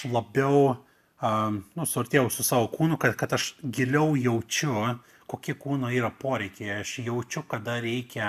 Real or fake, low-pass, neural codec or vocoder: real; 14.4 kHz; none